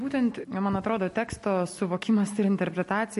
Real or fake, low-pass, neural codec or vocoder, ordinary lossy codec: real; 14.4 kHz; none; MP3, 48 kbps